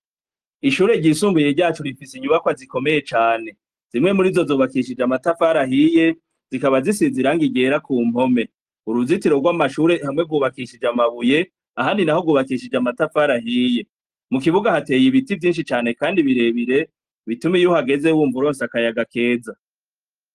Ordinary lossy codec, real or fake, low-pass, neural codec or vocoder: Opus, 24 kbps; real; 14.4 kHz; none